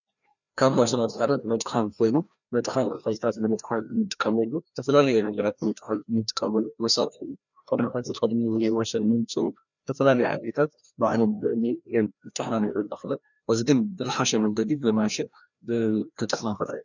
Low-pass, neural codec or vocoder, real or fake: 7.2 kHz; codec, 16 kHz, 1 kbps, FreqCodec, larger model; fake